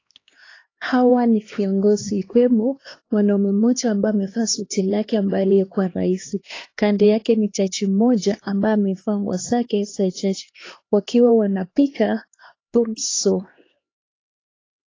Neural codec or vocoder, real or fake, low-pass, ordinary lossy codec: codec, 16 kHz, 2 kbps, X-Codec, HuBERT features, trained on LibriSpeech; fake; 7.2 kHz; AAC, 32 kbps